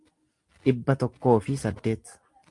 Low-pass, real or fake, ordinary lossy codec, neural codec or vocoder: 10.8 kHz; real; Opus, 32 kbps; none